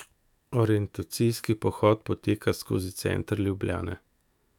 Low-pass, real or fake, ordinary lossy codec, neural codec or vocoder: 19.8 kHz; fake; none; autoencoder, 48 kHz, 128 numbers a frame, DAC-VAE, trained on Japanese speech